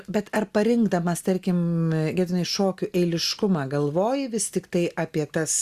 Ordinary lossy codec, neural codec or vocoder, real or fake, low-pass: Opus, 64 kbps; none; real; 14.4 kHz